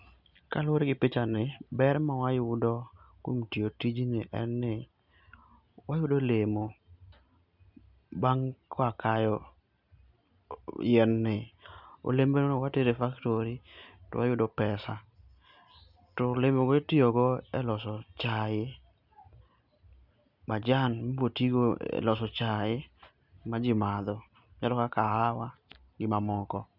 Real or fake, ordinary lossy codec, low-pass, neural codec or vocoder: real; none; 5.4 kHz; none